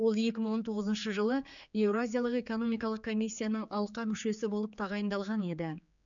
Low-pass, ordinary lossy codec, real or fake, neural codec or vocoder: 7.2 kHz; none; fake; codec, 16 kHz, 4 kbps, X-Codec, HuBERT features, trained on general audio